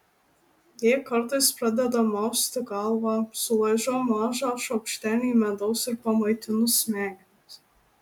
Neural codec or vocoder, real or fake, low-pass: none; real; 19.8 kHz